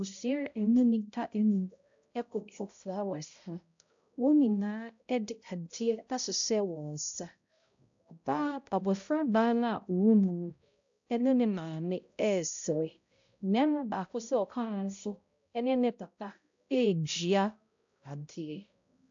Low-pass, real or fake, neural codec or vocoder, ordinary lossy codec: 7.2 kHz; fake; codec, 16 kHz, 0.5 kbps, X-Codec, HuBERT features, trained on balanced general audio; AAC, 64 kbps